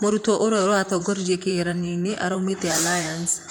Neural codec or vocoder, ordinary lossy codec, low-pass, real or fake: vocoder, 44.1 kHz, 128 mel bands, Pupu-Vocoder; none; none; fake